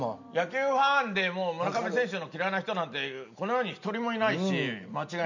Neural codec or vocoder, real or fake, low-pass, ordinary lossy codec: none; real; 7.2 kHz; none